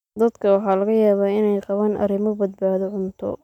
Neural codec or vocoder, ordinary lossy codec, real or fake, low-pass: none; none; real; 19.8 kHz